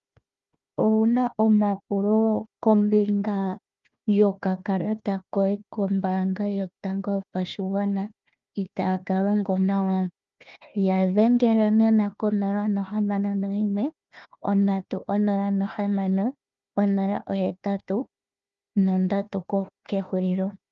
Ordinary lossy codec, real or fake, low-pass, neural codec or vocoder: Opus, 32 kbps; fake; 7.2 kHz; codec, 16 kHz, 1 kbps, FunCodec, trained on Chinese and English, 50 frames a second